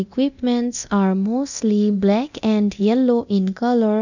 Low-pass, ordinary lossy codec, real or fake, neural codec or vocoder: 7.2 kHz; none; fake; codec, 24 kHz, 0.9 kbps, DualCodec